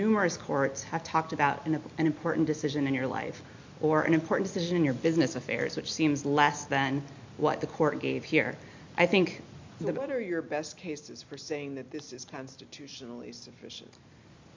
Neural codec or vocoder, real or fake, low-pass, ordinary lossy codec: none; real; 7.2 kHz; MP3, 48 kbps